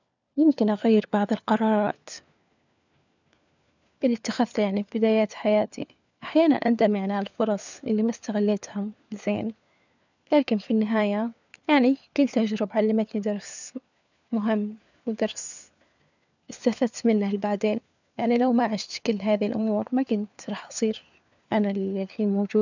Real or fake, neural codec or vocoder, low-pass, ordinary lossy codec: fake; codec, 16 kHz, 4 kbps, FunCodec, trained on LibriTTS, 50 frames a second; 7.2 kHz; none